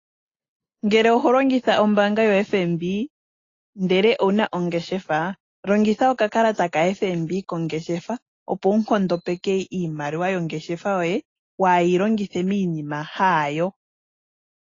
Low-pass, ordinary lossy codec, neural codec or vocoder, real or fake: 7.2 kHz; AAC, 32 kbps; none; real